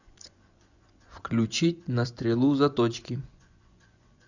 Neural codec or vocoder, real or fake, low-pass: vocoder, 24 kHz, 100 mel bands, Vocos; fake; 7.2 kHz